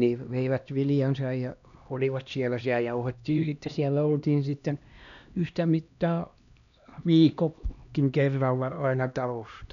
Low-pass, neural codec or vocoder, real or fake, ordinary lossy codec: 7.2 kHz; codec, 16 kHz, 1 kbps, X-Codec, HuBERT features, trained on LibriSpeech; fake; none